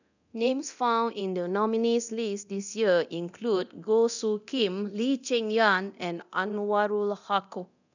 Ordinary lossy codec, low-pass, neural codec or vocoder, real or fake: none; 7.2 kHz; codec, 24 kHz, 0.9 kbps, DualCodec; fake